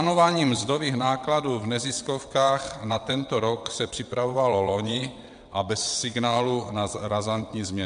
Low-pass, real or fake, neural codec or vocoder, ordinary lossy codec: 9.9 kHz; fake; vocoder, 22.05 kHz, 80 mel bands, WaveNeXt; MP3, 64 kbps